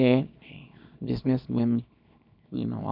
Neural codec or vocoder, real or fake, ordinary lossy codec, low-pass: codec, 24 kHz, 0.9 kbps, WavTokenizer, small release; fake; none; 5.4 kHz